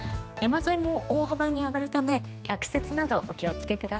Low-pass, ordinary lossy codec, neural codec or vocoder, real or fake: none; none; codec, 16 kHz, 2 kbps, X-Codec, HuBERT features, trained on general audio; fake